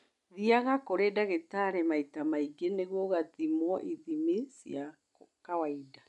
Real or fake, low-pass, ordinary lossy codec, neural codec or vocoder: real; 10.8 kHz; none; none